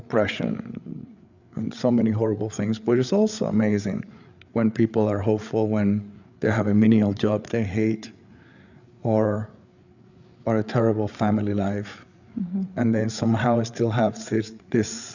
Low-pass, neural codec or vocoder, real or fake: 7.2 kHz; codec, 16 kHz, 8 kbps, FreqCodec, larger model; fake